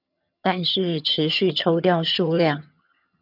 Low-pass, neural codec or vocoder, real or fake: 5.4 kHz; vocoder, 22.05 kHz, 80 mel bands, HiFi-GAN; fake